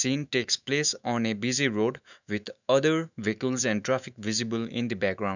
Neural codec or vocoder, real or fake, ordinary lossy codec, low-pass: autoencoder, 48 kHz, 128 numbers a frame, DAC-VAE, trained on Japanese speech; fake; none; 7.2 kHz